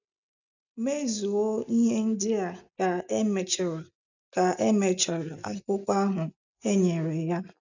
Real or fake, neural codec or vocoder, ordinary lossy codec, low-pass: real; none; none; 7.2 kHz